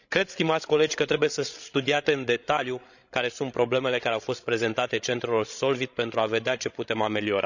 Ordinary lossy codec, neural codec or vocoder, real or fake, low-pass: none; codec, 16 kHz, 16 kbps, FreqCodec, larger model; fake; 7.2 kHz